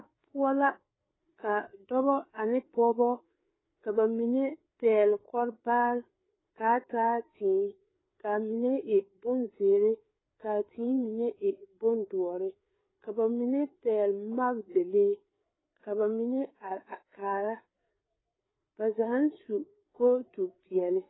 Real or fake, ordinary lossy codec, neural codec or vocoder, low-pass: fake; AAC, 16 kbps; codec, 16 kHz, 4 kbps, FreqCodec, larger model; 7.2 kHz